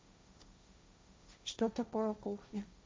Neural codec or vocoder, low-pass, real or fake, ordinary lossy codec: codec, 16 kHz, 1.1 kbps, Voila-Tokenizer; none; fake; none